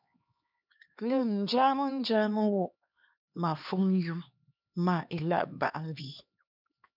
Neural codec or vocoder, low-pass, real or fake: codec, 16 kHz, 2 kbps, X-Codec, HuBERT features, trained on LibriSpeech; 5.4 kHz; fake